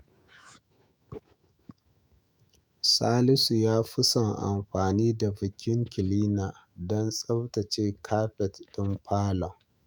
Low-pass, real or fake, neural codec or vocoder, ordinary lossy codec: none; fake; autoencoder, 48 kHz, 128 numbers a frame, DAC-VAE, trained on Japanese speech; none